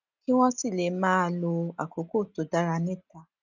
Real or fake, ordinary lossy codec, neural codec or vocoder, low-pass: real; none; none; 7.2 kHz